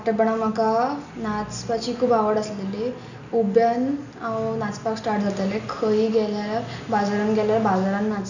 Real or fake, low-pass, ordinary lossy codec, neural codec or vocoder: real; 7.2 kHz; none; none